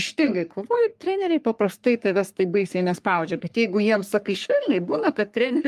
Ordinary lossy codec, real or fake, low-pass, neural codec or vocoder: Opus, 32 kbps; fake; 14.4 kHz; codec, 44.1 kHz, 3.4 kbps, Pupu-Codec